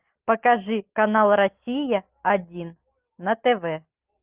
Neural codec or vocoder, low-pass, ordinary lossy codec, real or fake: none; 3.6 kHz; Opus, 32 kbps; real